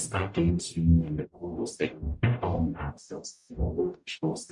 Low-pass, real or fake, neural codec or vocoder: 10.8 kHz; fake; codec, 44.1 kHz, 0.9 kbps, DAC